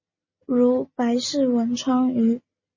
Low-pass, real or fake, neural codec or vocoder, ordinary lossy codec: 7.2 kHz; real; none; MP3, 32 kbps